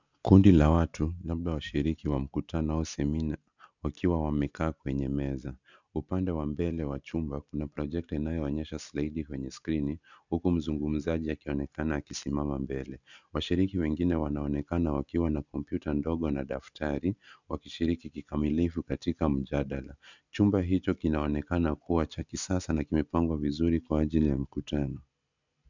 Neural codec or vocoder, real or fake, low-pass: none; real; 7.2 kHz